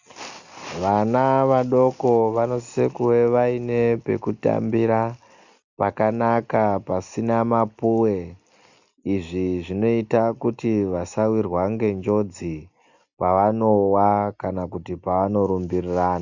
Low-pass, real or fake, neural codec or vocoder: 7.2 kHz; real; none